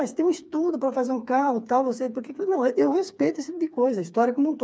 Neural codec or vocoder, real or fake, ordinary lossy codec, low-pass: codec, 16 kHz, 4 kbps, FreqCodec, smaller model; fake; none; none